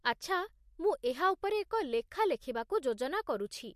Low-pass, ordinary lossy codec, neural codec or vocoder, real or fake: 14.4 kHz; MP3, 64 kbps; none; real